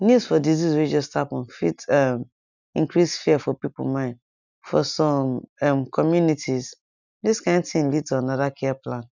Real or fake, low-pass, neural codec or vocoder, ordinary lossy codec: real; 7.2 kHz; none; none